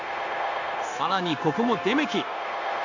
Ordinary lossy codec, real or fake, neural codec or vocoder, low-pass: none; fake; codec, 16 kHz, 0.9 kbps, LongCat-Audio-Codec; 7.2 kHz